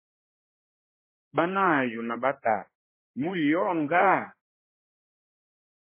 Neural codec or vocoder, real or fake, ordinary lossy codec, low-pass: codec, 16 kHz, 2 kbps, X-Codec, HuBERT features, trained on general audio; fake; MP3, 16 kbps; 3.6 kHz